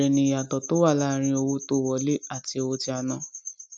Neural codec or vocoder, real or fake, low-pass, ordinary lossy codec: none; real; none; none